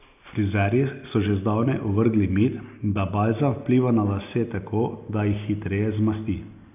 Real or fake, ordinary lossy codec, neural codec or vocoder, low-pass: real; AAC, 32 kbps; none; 3.6 kHz